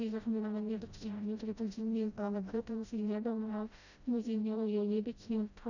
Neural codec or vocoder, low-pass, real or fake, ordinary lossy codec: codec, 16 kHz, 0.5 kbps, FreqCodec, smaller model; 7.2 kHz; fake; none